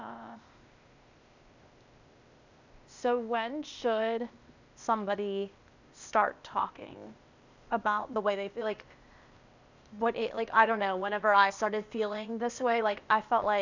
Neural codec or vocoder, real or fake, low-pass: codec, 16 kHz, 0.8 kbps, ZipCodec; fake; 7.2 kHz